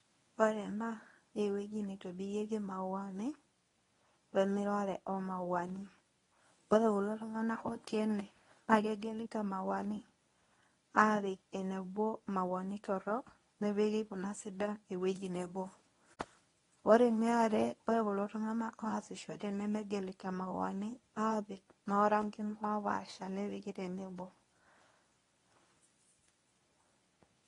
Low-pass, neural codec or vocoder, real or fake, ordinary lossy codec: 10.8 kHz; codec, 24 kHz, 0.9 kbps, WavTokenizer, medium speech release version 1; fake; AAC, 32 kbps